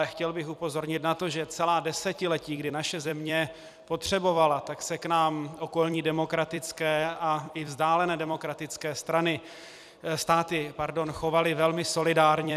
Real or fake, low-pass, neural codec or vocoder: fake; 14.4 kHz; vocoder, 44.1 kHz, 128 mel bands every 256 samples, BigVGAN v2